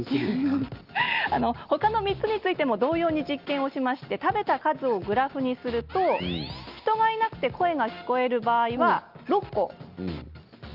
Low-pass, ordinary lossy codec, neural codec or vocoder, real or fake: 5.4 kHz; Opus, 24 kbps; none; real